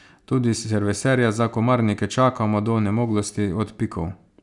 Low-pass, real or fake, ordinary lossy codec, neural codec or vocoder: 10.8 kHz; real; none; none